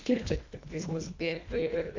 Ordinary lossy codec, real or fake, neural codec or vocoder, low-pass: MP3, 64 kbps; fake; codec, 24 kHz, 1.5 kbps, HILCodec; 7.2 kHz